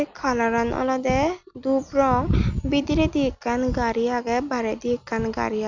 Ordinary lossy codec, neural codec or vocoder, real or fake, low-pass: none; none; real; 7.2 kHz